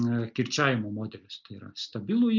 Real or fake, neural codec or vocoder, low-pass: real; none; 7.2 kHz